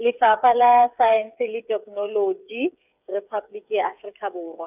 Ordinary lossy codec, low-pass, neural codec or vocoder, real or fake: none; 3.6 kHz; codec, 16 kHz, 8 kbps, FreqCodec, smaller model; fake